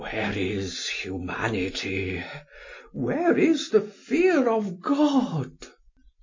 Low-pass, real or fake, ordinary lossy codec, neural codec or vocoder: 7.2 kHz; real; MP3, 32 kbps; none